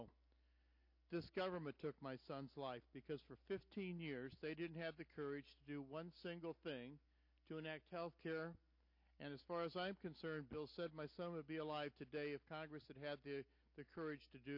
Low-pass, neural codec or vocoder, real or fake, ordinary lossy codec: 5.4 kHz; none; real; MP3, 32 kbps